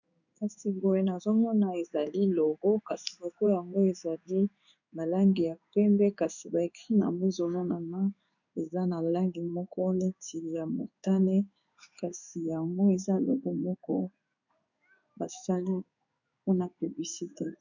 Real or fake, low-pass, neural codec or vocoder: fake; 7.2 kHz; codec, 16 kHz in and 24 kHz out, 1 kbps, XY-Tokenizer